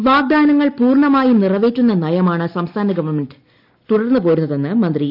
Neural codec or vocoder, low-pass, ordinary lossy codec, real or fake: none; 5.4 kHz; none; real